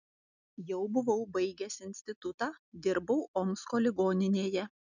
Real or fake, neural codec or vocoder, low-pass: real; none; 7.2 kHz